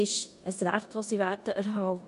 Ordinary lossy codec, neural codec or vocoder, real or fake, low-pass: none; codec, 16 kHz in and 24 kHz out, 0.9 kbps, LongCat-Audio-Codec, four codebook decoder; fake; 10.8 kHz